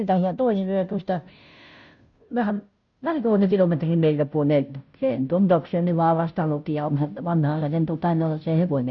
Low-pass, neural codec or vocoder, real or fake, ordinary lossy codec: 7.2 kHz; codec, 16 kHz, 0.5 kbps, FunCodec, trained on Chinese and English, 25 frames a second; fake; none